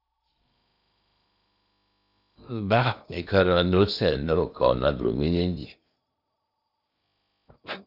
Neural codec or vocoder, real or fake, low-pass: codec, 16 kHz in and 24 kHz out, 0.8 kbps, FocalCodec, streaming, 65536 codes; fake; 5.4 kHz